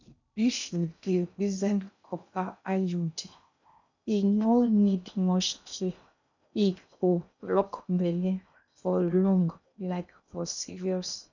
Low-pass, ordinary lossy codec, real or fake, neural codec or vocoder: 7.2 kHz; none; fake; codec, 16 kHz in and 24 kHz out, 0.6 kbps, FocalCodec, streaming, 2048 codes